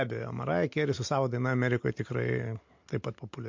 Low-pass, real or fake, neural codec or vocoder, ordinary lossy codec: 7.2 kHz; real; none; MP3, 48 kbps